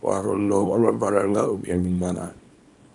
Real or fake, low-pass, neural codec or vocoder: fake; 10.8 kHz; codec, 24 kHz, 0.9 kbps, WavTokenizer, small release